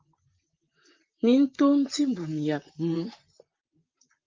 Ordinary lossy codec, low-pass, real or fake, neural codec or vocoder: Opus, 32 kbps; 7.2 kHz; fake; vocoder, 44.1 kHz, 128 mel bands, Pupu-Vocoder